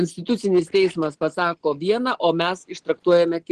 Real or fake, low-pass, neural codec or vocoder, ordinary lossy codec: real; 9.9 kHz; none; Opus, 16 kbps